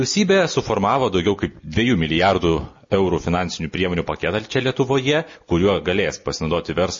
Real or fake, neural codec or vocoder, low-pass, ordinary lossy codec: real; none; 7.2 kHz; MP3, 32 kbps